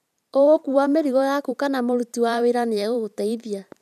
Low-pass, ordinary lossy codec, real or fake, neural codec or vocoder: 14.4 kHz; none; fake; vocoder, 44.1 kHz, 128 mel bands every 512 samples, BigVGAN v2